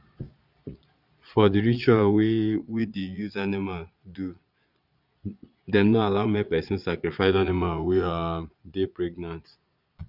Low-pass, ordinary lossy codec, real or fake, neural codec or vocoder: 5.4 kHz; none; fake; vocoder, 22.05 kHz, 80 mel bands, Vocos